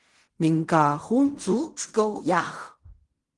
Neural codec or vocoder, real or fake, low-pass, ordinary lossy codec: codec, 16 kHz in and 24 kHz out, 0.4 kbps, LongCat-Audio-Codec, fine tuned four codebook decoder; fake; 10.8 kHz; Opus, 24 kbps